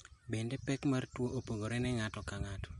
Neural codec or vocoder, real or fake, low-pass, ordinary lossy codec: none; real; 19.8 kHz; MP3, 48 kbps